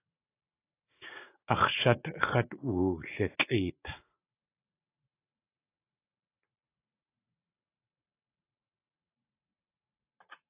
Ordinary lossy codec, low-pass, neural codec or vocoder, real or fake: AAC, 24 kbps; 3.6 kHz; vocoder, 44.1 kHz, 80 mel bands, Vocos; fake